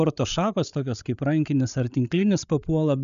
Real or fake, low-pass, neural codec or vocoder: fake; 7.2 kHz; codec, 16 kHz, 8 kbps, FreqCodec, larger model